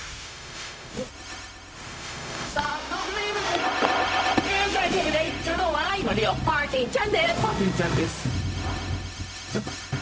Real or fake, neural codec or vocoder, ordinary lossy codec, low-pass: fake; codec, 16 kHz, 0.4 kbps, LongCat-Audio-Codec; none; none